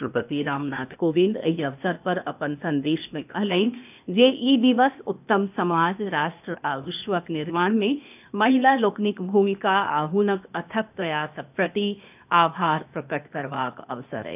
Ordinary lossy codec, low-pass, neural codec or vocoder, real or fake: none; 3.6 kHz; codec, 16 kHz, 0.8 kbps, ZipCodec; fake